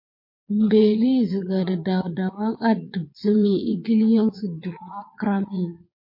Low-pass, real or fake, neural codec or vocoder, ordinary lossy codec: 5.4 kHz; fake; vocoder, 22.05 kHz, 80 mel bands, Vocos; MP3, 32 kbps